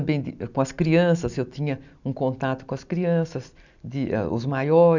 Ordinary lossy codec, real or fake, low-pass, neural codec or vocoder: none; real; 7.2 kHz; none